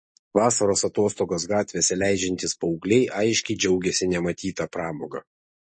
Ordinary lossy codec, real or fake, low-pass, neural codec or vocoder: MP3, 32 kbps; real; 9.9 kHz; none